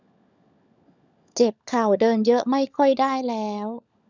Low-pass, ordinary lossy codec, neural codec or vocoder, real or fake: 7.2 kHz; none; codec, 16 kHz, 16 kbps, FunCodec, trained on LibriTTS, 50 frames a second; fake